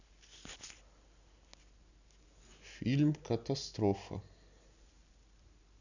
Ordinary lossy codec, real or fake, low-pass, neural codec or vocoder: none; real; 7.2 kHz; none